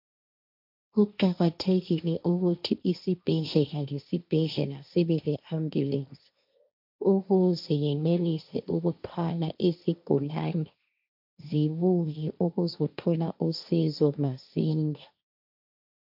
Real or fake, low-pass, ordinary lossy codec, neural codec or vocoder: fake; 5.4 kHz; MP3, 48 kbps; codec, 16 kHz, 1.1 kbps, Voila-Tokenizer